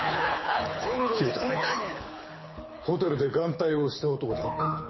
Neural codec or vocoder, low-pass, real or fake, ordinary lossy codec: codec, 24 kHz, 6 kbps, HILCodec; 7.2 kHz; fake; MP3, 24 kbps